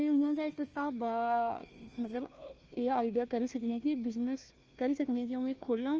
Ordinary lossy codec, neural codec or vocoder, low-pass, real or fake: Opus, 24 kbps; codec, 16 kHz, 1 kbps, FunCodec, trained on Chinese and English, 50 frames a second; 7.2 kHz; fake